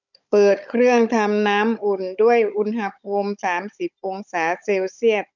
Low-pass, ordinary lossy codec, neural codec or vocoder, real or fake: 7.2 kHz; none; codec, 16 kHz, 16 kbps, FunCodec, trained on Chinese and English, 50 frames a second; fake